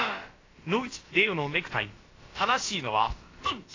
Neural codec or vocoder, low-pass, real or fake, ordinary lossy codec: codec, 16 kHz, about 1 kbps, DyCAST, with the encoder's durations; 7.2 kHz; fake; AAC, 32 kbps